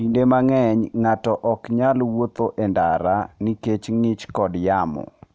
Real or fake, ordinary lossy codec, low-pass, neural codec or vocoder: real; none; none; none